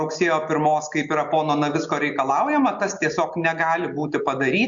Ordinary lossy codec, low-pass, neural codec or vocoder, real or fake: Opus, 64 kbps; 7.2 kHz; none; real